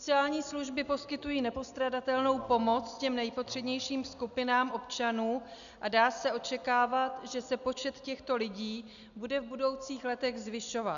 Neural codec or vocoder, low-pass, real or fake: none; 7.2 kHz; real